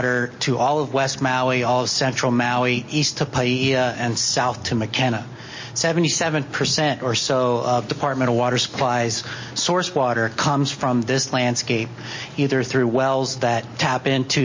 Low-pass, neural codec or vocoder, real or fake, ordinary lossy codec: 7.2 kHz; none; real; MP3, 32 kbps